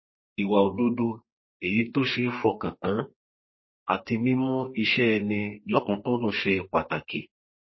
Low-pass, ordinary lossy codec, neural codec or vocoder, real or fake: 7.2 kHz; MP3, 24 kbps; codec, 32 kHz, 1.9 kbps, SNAC; fake